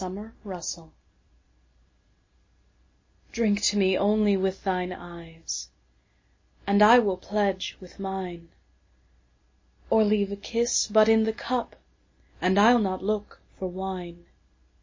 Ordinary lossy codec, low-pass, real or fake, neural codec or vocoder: MP3, 32 kbps; 7.2 kHz; real; none